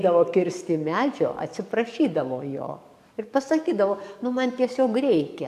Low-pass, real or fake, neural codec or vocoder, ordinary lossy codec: 14.4 kHz; fake; codec, 44.1 kHz, 7.8 kbps, DAC; MP3, 96 kbps